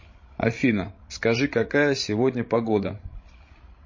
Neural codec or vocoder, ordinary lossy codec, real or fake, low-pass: codec, 16 kHz, 16 kbps, FreqCodec, larger model; MP3, 32 kbps; fake; 7.2 kHz